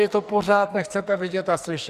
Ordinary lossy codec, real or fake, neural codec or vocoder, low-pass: Opus, 64 kbps; fake; codec, 44.1 kHz, 2.6 kbps, SNAC; 14.4 kHz